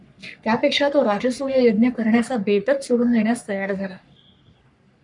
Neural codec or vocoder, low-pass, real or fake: codec, 44.1 kHz, 3.4 kbps, Pupu-Codec; 10.8 kHz; fake